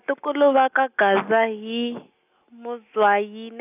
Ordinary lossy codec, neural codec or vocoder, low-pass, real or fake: none; none; 3.6 kHz; real